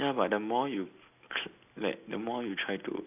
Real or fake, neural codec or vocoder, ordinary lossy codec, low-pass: real; none; AAC, 32 kbps; 3.6 kHz